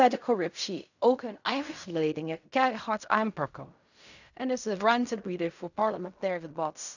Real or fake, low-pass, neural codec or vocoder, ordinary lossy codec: fake; 7.2 kHz; codec, 16 kHz in and 24 kHz out, 0.4 kbps, LongCat-Audio-Codec, fine tuned four codebook decoder; none